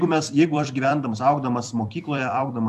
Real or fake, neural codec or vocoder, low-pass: fake; vocoder, 44.1 kHz, 128 mel bands every 256 samples, BigVGAN v2; 14.4 kHz